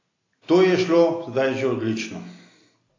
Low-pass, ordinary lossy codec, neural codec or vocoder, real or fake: 7.2 kHz; AAC, 32 kbps; none; real